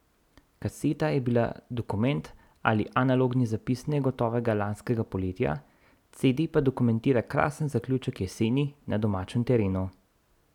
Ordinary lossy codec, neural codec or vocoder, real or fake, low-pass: none; none; real; 19.8 kHz